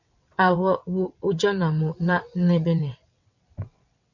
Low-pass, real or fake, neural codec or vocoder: 7.2 kHz; fake; vocoder, 22.05 kHz, 80 mel bands, WaveNeXt